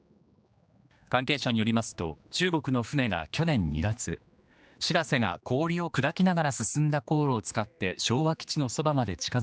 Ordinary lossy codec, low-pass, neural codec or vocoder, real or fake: none; none; codec, 16 kHz, 2 kbps, X-Codec, HuBERT features, trained on general audio; fake